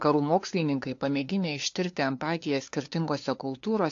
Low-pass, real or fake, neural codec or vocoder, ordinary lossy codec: 7.2 kHz; fake; codec, 16 kHz, 2 kbps, FunCodec, trained on LibriTTS, 25 frames a second; AAC, 48 kbps